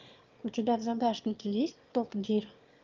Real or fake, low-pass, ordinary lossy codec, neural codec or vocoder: fake; 7.2 kHz; Opus, 24 kbps; autoencoder, 22.05 kHz, a latent of 192 numbers a frame, VITS, trained on one speaker